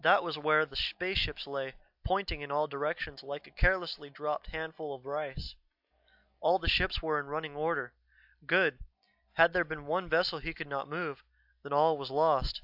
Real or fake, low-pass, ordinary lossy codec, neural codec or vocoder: real; 5.4 kHz; AAC, 48 kbps; none